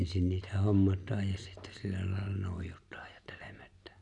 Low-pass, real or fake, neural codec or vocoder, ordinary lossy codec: 10.8 kHz; real; none; none